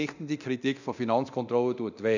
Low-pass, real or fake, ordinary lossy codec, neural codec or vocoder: 7.2 kHz; fake; none; codec, 24 kHz, 0.9 kbps, DualCodec